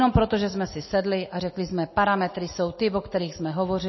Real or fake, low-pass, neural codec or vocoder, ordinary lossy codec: real; 7.2 kHz; none; MP3, 24 kbps